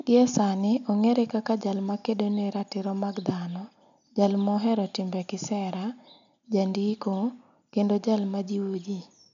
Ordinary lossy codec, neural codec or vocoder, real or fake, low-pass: none; none; real; 7.2 kHz